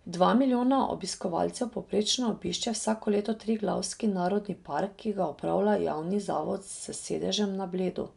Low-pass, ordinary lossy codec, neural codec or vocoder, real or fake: 10.8 kHz; none; none; real